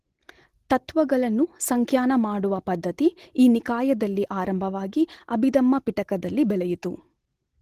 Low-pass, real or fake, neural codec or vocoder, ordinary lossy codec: 14.4 kHz; real; none; Opus, 16 kbps